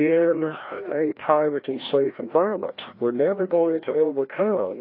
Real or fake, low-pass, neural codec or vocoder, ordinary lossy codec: fake; 5.4 kHz; codec, 16 kHz, 1 kbps, FreqCodec, larger model; AAC, 32 kbps